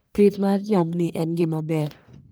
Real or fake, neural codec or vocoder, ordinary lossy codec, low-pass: fake; codec, 44.1 kHz, 1.7 kbps, Pupu-Codec; none; none